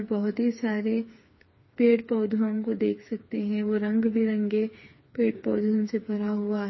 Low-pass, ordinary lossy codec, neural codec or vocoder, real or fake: 7.2 kHz; MP3, 24 kbps; codec, 16 kHz, 4 kbps, FreqCodec, smaller model; fake